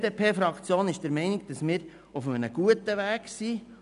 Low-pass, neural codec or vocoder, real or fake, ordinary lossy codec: 10.8 kHz; none; real; none